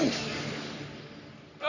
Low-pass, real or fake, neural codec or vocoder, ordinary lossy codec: 7.2 kHz; fake; codec, 44.1 kHz, 3.4 kbps, Pupu-Codec; none